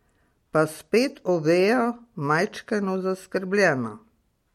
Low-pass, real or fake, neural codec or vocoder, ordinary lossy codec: 19.8 kHz; real; none; MP3, 64 kbps